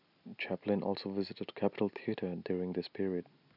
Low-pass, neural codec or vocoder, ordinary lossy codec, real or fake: 5.4 kHz; none; none; real